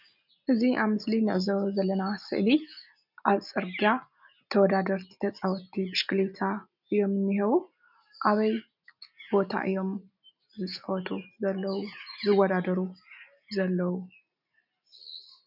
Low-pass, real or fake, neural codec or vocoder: 5.4 kHz; real; none